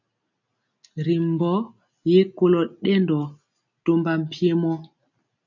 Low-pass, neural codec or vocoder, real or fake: 7.2 kHz; none; real